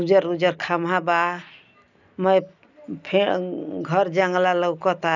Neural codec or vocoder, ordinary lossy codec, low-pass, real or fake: none; none; 7.2 kHz; real